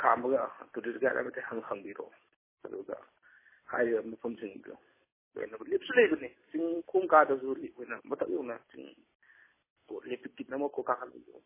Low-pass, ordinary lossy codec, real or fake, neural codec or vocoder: 3.6 kHz; MP3, 16 kbps; real; none